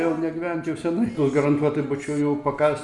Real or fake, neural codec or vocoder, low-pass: real; none; 10.8 kHz